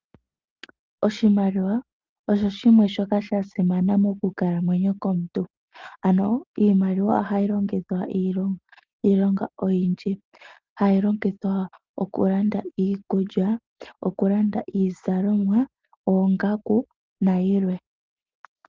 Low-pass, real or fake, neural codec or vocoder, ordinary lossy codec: 7.2 kHz; real; none; Opus, 32 kbps